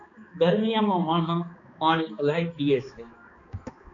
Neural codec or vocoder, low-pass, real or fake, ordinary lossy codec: codec, 16 kHz, 2 kbps, X-Codec, HuBERT features, trained on balanced general audio; 7.2 kHz; fake; MP3, 64 kbps